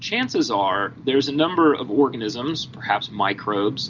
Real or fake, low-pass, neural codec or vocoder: real; 7.2 kHz; none